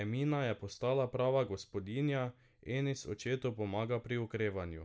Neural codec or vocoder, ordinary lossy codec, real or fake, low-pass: none; none; real; none